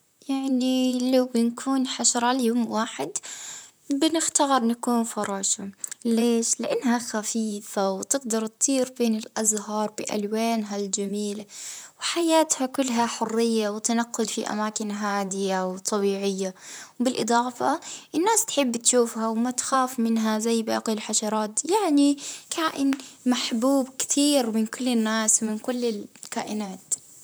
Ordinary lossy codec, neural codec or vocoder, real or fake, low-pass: none; vocoder, 44.1 kHz, 128 mel bands, Pupu-Vocoder; fake; none